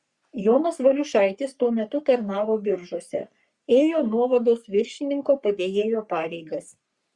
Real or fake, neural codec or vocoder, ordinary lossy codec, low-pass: fake; codec, 44.1 kHz, 3.4 kbps, Pupu-Codec; Opus, 64 kbps; 10.8 kHz